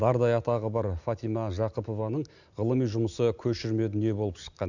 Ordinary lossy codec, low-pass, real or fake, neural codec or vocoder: none; 7.2 kHz; real; none